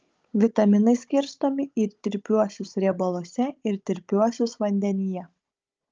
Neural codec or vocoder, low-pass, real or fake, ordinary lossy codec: codec, 16 kHz, 16 kbps, FunCodec, trained on Chinese and English, 50 frames a second; 7.2 kHz; fake; Opus, 24 kbps